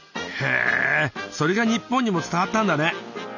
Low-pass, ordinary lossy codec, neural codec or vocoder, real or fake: 7.2 kHz; none; none; real